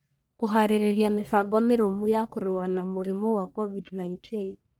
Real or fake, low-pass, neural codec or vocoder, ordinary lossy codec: fake; none; codec, 44.1 kHz, 1.7 kbps, Pupu-Codec; none